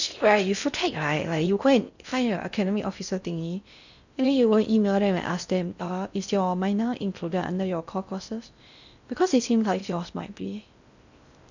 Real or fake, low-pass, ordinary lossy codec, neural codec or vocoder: fake; 7.2 kHz; none; codec, 16 kHz in and 24 kHz out, 0.6 kbps, FocalCodec, streaming, 4096 codes